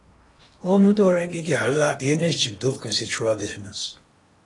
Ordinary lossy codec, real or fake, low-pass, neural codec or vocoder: AAC, 32 kbps; fake; 10.8 kHz; codec, 16 kHz in and 24 kHz out, 0.8 kbps, FocalCodec, streaming, 65536 codes